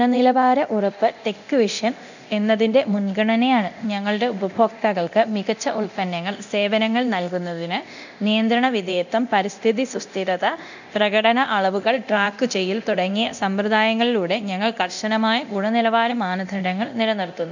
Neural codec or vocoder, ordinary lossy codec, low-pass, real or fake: codec, 24 kHz, 0.9 kbps, DualCodec; none; 7.2 kHz; fake